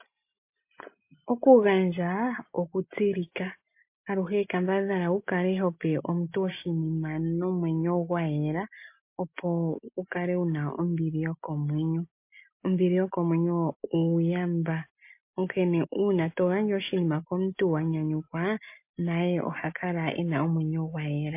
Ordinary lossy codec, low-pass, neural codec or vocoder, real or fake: MP3, 24 kbps; 3.6 kHz; none; real